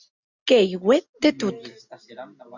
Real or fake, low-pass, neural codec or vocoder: real; 7.2 kHz; none